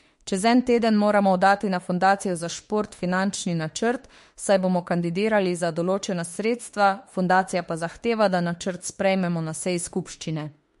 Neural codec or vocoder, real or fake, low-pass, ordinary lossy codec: autoencoder, 48 kHz, 32 numbers a frame, DAC-VAE, trained on Japanese speech; fake; 14.4 kHz; MP3, 48 kbps